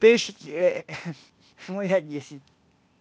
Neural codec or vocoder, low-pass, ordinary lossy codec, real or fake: codec, 16 kHz, 0.8 kbps, ZipCodec; none; none; fake